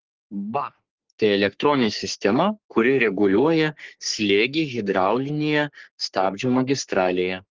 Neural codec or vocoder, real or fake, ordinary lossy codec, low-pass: codec, 44.1 kHz, 3.4 kbps, Pupu-Codec; fake; Opus, 16 kbps; 7.2 kHz